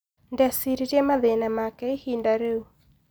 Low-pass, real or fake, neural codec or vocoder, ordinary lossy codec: none; real; none; none